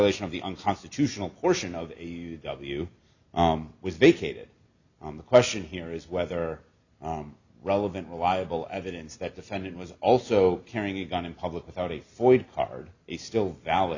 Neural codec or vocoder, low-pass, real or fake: none; 7.2 kHz; real